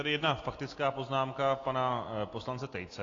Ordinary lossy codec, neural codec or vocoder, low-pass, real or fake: AAC, 32 kbps; none; 7.2 kHz; real